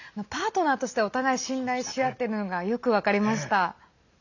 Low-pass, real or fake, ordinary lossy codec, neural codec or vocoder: 7.2 kHz; real; none; none